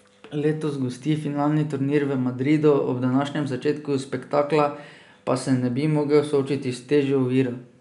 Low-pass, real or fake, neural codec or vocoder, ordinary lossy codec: 10.8 kHz; real; none; none